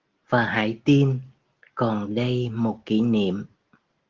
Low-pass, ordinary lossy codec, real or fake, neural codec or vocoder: 7.2 kHz; Opus, 16 kbps; real; none